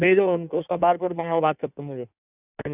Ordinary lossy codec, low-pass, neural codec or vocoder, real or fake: none; 3.6 kHz; codec, 16 kHz in and 24 kHz out, 1.1 kbps, FireRedTTS-2 codec; fake